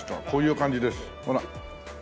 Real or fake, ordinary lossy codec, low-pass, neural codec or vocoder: real; none; none; none